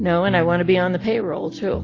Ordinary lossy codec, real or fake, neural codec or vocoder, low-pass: AAC, 32 kbps; real; none; 7.2 kHz